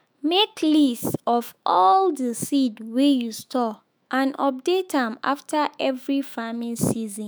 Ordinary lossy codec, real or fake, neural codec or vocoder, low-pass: none; fake; autoencoder, 48 kHz, 128 numbers a frame, DAC-VAE, trained on Japanese speech; none